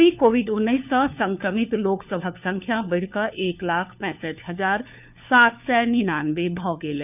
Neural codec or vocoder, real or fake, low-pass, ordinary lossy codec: codec, 16 kHz, 4 kbps, FunCodec, trained on LibriTTS, 50 frames a second; fake; 3.6 kHz; none